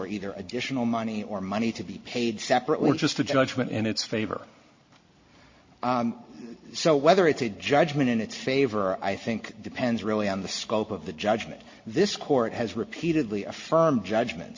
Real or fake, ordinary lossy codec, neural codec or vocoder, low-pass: real; MP3, 32 kbps; none; 7.2 kHz